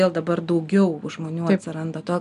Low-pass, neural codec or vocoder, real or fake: 10.8 kHz; none; real